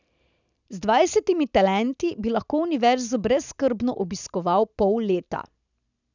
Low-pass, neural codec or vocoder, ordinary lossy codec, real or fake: 7.2 kHz; none; none; real